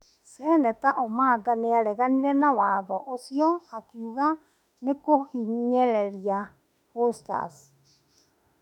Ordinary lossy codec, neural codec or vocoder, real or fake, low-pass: none; autoencoder, 48 kHz, 32 numbers a frame, DAC-VAE, trained on Japanese speech; fake; 19.8 kHz